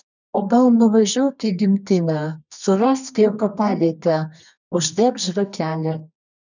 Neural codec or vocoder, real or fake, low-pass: codec, 24 kHz, 0.9 kbps, WavTokenizer, medium music audio release; fake; 7.2 kHz